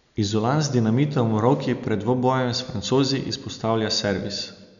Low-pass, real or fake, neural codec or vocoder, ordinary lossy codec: 7.2 kHz; real; none; none